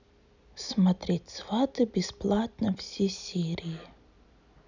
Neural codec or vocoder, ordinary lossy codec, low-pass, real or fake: none; none; 7.2 kHz; real